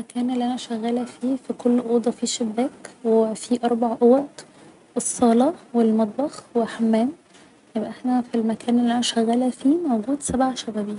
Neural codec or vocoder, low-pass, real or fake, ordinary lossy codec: none; 10.8 kHz; real; none